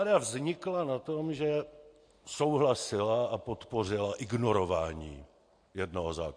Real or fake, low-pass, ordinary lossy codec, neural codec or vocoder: real; 9.9 kHz; MP3, 48 kbps; none